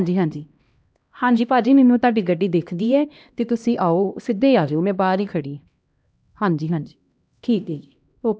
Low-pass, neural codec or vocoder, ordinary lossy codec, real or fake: none; codec, 16 kHz, 1 kbps, X-Codec, HuBERT features, trained on LibriSpeech; none; fake